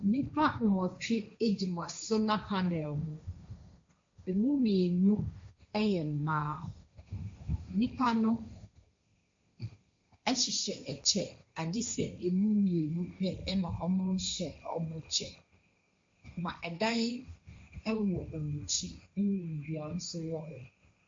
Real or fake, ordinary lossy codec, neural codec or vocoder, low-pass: fake; MP3, 48 kbps; codec, 16 kHz, 1.1 kbps, Voila-Tokenizer; 7.2 kHz